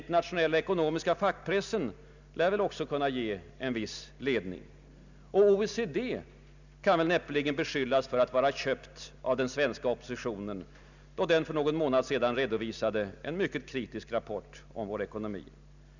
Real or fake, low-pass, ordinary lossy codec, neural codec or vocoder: real; 7.2 kHz; none; none